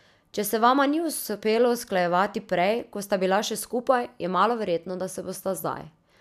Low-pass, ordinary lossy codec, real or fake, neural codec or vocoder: 14.4 kHz; none; real; none